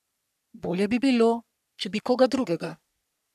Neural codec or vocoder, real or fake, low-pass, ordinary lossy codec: codec, 44.1 kHz, 3.4 kbps, Pupu-Codec; fake; 14.4 kHz; none